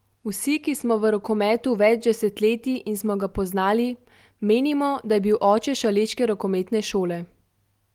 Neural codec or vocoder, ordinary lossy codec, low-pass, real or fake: none; Opus, 24 kbps; 19.8 kHz; real